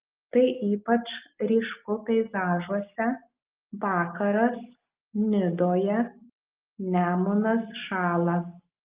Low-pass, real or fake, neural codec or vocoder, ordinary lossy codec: 3.6 kHz; real; none; Opus, 32 kbps